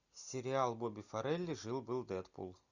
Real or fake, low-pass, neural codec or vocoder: real; 7.2 kHz; none